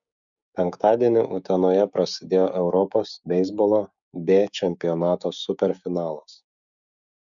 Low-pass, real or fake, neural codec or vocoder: 7.2 kHz; fake; codec, 16 kHz, 6 kbps, DAC